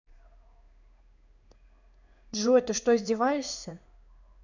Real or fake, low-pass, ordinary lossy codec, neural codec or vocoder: fake; 7.2 kHz; none; codec, 16 kHz in and 24 kHz out, 1 kbps, XY-Tokenizer